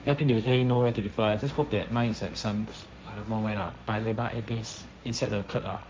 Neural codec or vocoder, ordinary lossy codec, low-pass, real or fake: codec, 16 kHz, 1.1 kbps, Voila-Tokenizer; none; none; fake